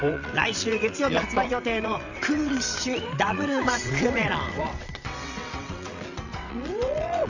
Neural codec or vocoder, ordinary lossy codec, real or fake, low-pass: vocoder, 22.05 kHz, 80 mel bands, WaveNeXt; none; fake; 7.2 kHz